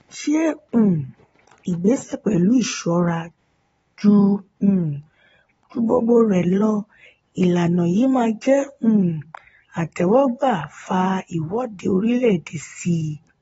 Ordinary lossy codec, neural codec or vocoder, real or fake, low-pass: AAC, 24 kbps; vocoder, 22.05 kHz, 80 mel bands, Vocos; fake; 9.9 kHz